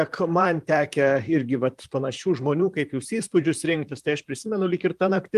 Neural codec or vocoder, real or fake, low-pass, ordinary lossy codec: vocoder, 44.1 kHz, 128 mel bands, Pupu-Vocoder; fake; 14.4 kHz; Opus, 32 kbps